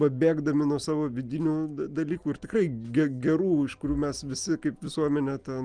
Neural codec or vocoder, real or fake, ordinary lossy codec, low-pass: none; real; Opus, 32 kbps; 9.9 kHz